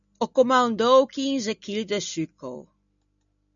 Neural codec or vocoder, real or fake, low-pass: none; real; 7.2 kHz